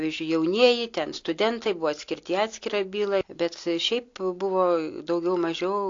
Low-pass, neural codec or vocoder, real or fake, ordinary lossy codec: 7.2 kHz; none; real; AAC, 48 kbps